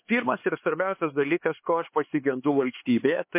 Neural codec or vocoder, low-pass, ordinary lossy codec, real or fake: codec, 16 kHz, 4 kbps, X-Codec, HuBERT features, trained on LibriSpeech; 3.6 kHz; MP3, 24 kbps; fake